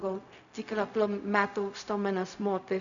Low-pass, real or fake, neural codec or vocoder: 7.2 kHz; fake; codec, 16 kHz, 0.4 kbps, LongCat-Audio-Codec